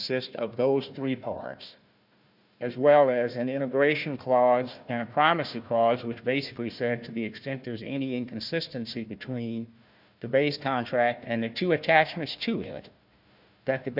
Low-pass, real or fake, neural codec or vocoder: 5.4 kHz; fake; codec, 16 kHz, 1 kbps, FunCodec, trained on Chinese and English, 50 frames a second